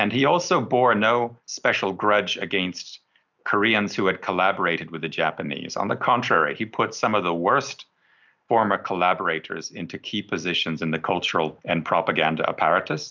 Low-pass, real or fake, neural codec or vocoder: 7.2 kHz; real; none